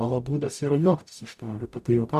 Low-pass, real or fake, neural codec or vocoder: 14.4 kHz; fake; codec, 44.1 kHz, 0.9 kbps, DAC